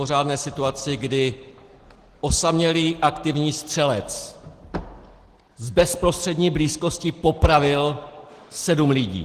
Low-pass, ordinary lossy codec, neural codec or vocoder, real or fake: 14.4 kHz; Opus, 16 kbps; none; real